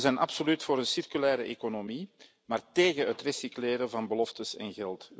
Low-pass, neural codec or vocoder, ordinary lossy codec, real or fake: none; none; none; real